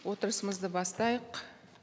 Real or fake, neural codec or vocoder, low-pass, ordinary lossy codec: real; none; none; none